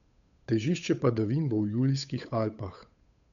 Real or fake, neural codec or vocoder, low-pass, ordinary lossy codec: fake; codec, 16 kHz, 8 kbps, FunCodec, trained on Chinese and English, 25 frames a second; 7.2 kHz; none